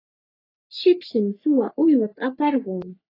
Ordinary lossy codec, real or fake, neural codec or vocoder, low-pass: AAC, 24 kbps; fake; codec, 44.1 kHz, 3.4 kbps, Pupu-Codec; 5.4 kHz